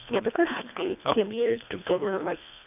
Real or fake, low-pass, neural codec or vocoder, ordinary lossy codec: fake; 3.6 kHz; codec, 24 kHz, 1.5 kbps, HILCodec; none